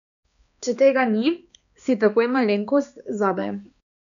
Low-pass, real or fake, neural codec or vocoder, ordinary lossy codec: 7.2 kHz; fake; codec, 16 kHz, 2 kbps, X-Codec, HuBERT features, trained on balanced general audio; none